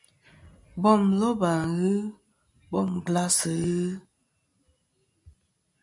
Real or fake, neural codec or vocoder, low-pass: real; none; 10.8 kHz